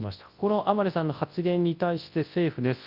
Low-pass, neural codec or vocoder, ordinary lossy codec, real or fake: 5.4 kHz; codec, 24 kHz, 0.9 kbps, WavTokenizer, large speech release; Opus, 24 kbps; fake